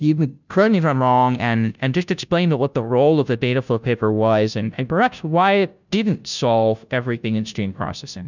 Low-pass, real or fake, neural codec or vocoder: 7.2 kHz; fake; codec, 16 kHz, 0.5 kbps, FunCodec, trained on Chinese and English, 25 frames a second